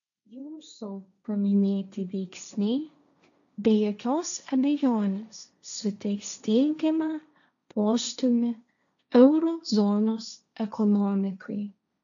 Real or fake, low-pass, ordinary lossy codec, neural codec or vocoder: fake; 7.2 kHz; MP3, 96 kbps; codec, 16 kHz, 1.1 kbps, Voila-Tokenizer